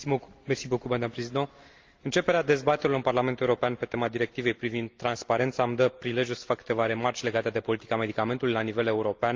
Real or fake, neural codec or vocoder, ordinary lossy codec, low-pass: real; none; Opus, 32 kbps; 7.2 kHz